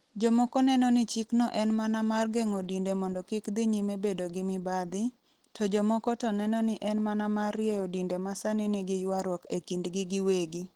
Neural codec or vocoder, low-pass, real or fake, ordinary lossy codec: none; 19.8 kHz; real; Opus, 16 kbps